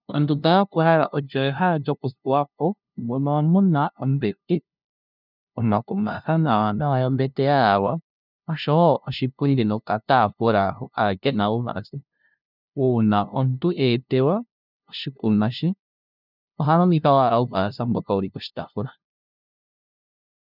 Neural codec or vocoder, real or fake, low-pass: codec, 16 kHz, 0.5 kbps, FunCodec, trained on LibriTTS, 25 frames a second; fake; 5.4 kHz